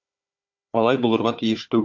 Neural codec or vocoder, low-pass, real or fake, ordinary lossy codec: codec, 16 kHz, 4 kbps, FunCodec, trained on Chinese and English, 50 frames a second; 7.2 kHz; fake; MP3, 48 kbps